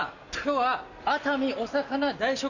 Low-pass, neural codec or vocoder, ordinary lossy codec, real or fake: 7.2 kHz; none; none; real